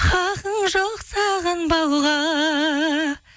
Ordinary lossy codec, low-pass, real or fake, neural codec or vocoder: none; none; real; none